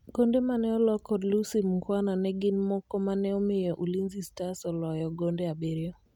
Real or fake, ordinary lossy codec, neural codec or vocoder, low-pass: real; none; none; 19.8 kHz